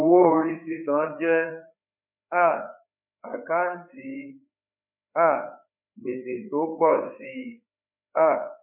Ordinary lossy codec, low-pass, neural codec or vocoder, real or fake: none; 3.6 kHz; codec, 16 kHz, 4 kbps, FreqCodec, larger model; fake